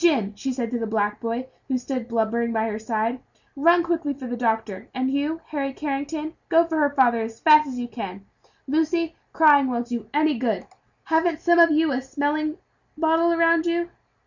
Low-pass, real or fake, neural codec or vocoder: 7.2 kHz; real; none